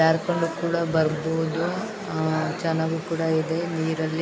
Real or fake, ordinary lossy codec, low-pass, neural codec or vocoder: real; none; none; none